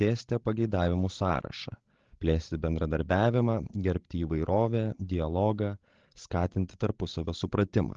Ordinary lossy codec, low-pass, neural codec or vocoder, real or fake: Opus, 16 kbps; 7.2 kHz; codec, 16 kHz, 16 kbps, FreqCodec, larger model; fake